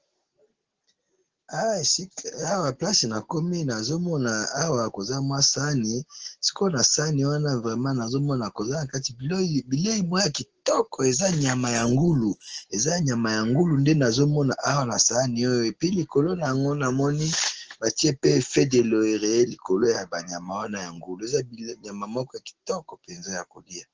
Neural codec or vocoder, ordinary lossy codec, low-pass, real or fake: none; Opus, 16 kbps; 7.2 kHz; real